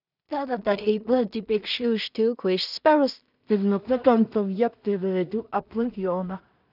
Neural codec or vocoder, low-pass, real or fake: codec, 16 kHz in and 24 kHz out, 0.4 kbps, LongCat-Audio-Codec, two codebook decoder; 5.4 kHz; fake